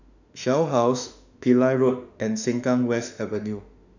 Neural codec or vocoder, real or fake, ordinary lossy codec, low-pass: autoencoder, 48 kHz, 32 numbers a frame, DAC-VAE, trained on Japanese speech; fake; none; 7.2 kHz